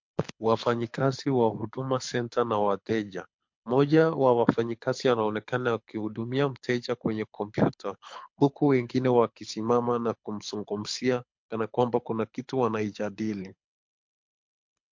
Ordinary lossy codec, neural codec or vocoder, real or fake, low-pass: MP3, 48 kbps; codec, 24 kHz, 6 kbps, HILCodec; fake; 7.2 kHz